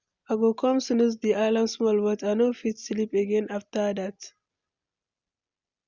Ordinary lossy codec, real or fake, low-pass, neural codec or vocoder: Opus, 64 kbps; real; 7.2 kHz; none